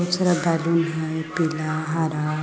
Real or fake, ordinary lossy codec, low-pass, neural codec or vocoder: real; none; none; none